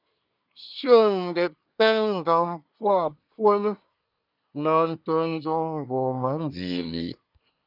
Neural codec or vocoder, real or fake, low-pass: codec, 24 kHz, 1 kbps, SNAC; fake; 5.4 kHz